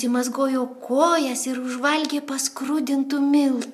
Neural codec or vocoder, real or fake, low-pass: none; real; 14.4 kHz